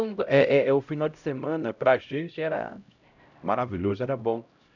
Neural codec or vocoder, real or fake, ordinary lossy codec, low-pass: codec, 16 kHz, 0.5 kbps, X-Codec, HuBERT features, trained on LibriSpeech; fake; none; 7.2 kHz